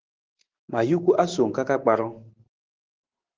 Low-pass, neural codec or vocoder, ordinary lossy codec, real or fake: 7.2 kHz; none; Opus, 16 kbps; real